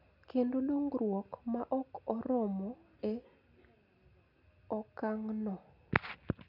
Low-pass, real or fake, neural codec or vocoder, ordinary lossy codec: 5.4 kHz; real; none; none